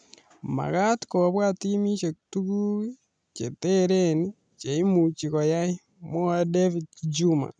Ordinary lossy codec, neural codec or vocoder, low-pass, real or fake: none; none; 9.9 kHz; real